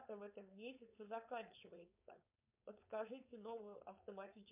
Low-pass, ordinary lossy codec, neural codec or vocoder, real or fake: 3.6 kHz; MP3, 32 kbps; codec, 16 kHz, 4.8 kbps, FACodec; fake